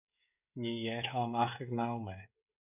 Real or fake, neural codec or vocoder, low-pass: fake; codec, 16 kHz, 16 kbps, FreqCodec, smaller model; 3.6 kHz